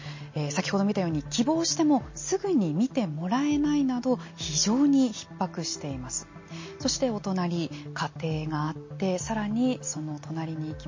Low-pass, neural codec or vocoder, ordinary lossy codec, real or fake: 7.2 kHz; none; MP3, 32 kbps; real